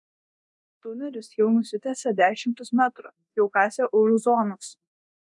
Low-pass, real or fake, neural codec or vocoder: 10.8 kHz; fake; codec, 24 kHz, 0.9 kbps, DualCodec